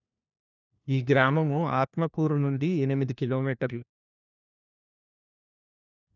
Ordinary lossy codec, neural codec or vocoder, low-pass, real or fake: none; codec, 16 kHz, 1 kbps, FunCodec, trained on LibriTTS, 50 frames a second; 7.2 kHz; fake